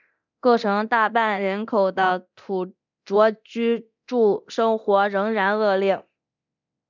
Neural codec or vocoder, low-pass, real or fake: codec, 24 kHz, 0.9 kbps, DualCodec; 7.2 kHz; fake